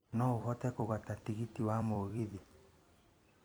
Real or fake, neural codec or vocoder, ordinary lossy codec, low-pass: fake; vocoder, 44.1 kHz, 128 mel bands every 256 samples, BigVGAN v2; none; none